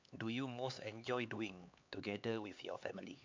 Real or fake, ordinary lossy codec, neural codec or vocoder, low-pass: fake; MP3, 64 kbps; codec, 16 kHz, 4 kbps, X-Codec, HuBERT features, trained on LibriSpeech; 7.2 kHz